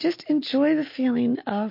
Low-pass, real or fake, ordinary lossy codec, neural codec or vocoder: 5.4 kHz; real; MP3, 32 kbps; none